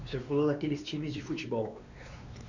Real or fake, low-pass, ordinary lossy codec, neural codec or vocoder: fake; 7.2 kHz; none; codec, 16 kHz, 2 kbps, X-Codec, WavLM features, trained on Multilingual LibriSpeech